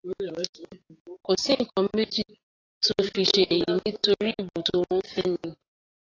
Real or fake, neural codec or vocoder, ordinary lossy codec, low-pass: fake; codec, 16 kHz, 6 kbps, DAC; AAC, 32 kbps; 7.2 kHz